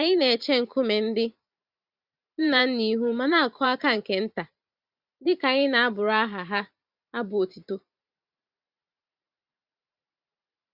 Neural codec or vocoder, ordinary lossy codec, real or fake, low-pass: vocoder, 44.1 kHz, 128 mel bands every 512 samples, BigVGAN v2; Opus, 64 kbps; fake; 5.4 kHz